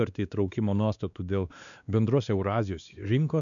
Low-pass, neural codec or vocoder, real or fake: 7.2 kHz; codec, 16 kHz, 2 kbps, X-Codec, WavLM features, trained on Multilingual LibriSpeech; fake